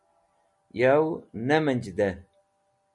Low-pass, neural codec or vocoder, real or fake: 10.8 kHz; none; real